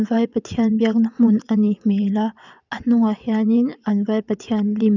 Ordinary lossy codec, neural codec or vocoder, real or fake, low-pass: none; vocoder, 22.05 kHz, 80 mel bands, WaveNeXt; fake; 7.2 kHz